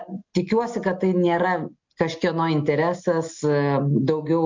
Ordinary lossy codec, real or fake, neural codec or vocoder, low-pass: MP3, 64 kbps; real; none; 7.2 kHz